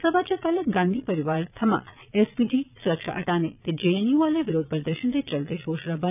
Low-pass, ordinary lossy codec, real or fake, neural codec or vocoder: 3.6 kHz; none; fake; vocoder, 22.05 kHz, 80 mel bands, Vocos